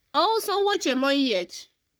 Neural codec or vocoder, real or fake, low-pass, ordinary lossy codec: codec, 44.1 kHz, 3.4 kbps, Pupu-Codec; fake; none; none